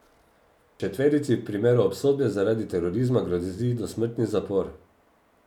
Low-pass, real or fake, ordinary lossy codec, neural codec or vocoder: 19.8 kHz; real; none; none